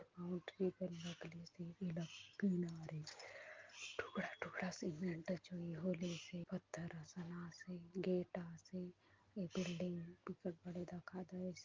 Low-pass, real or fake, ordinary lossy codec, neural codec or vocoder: 7.2 kHz; real; Opus, 32 kbps; none